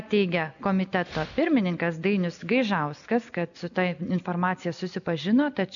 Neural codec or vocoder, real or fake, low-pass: none; real; 7.2 kHz